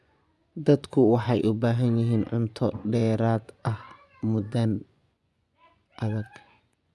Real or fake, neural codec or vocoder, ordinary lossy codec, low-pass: fake; vocoder, 24 kHz, 100 mel bands, Vocos; none; none